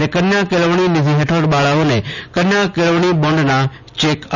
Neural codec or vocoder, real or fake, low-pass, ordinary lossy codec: none; real; 7.2 kHz; none